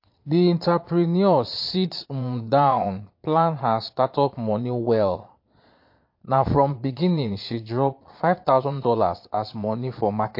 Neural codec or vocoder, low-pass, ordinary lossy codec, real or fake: vocoder, 22.05 kHz, 80 mel bands, Vocos; 5.4 kHz; MP3, 32 kbps; fake